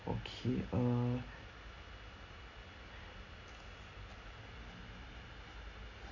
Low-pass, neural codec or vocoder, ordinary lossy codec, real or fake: 7.2 kHz; none; none; real